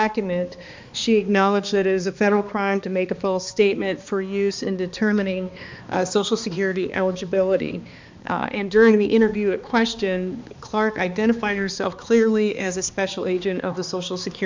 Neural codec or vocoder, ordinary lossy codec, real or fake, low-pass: codec, 16 kHz, 2 kbps, X-Codec, HuBERT features, trained on balanced general audio; MP3, 64 kbps; fake; 7.2 kHz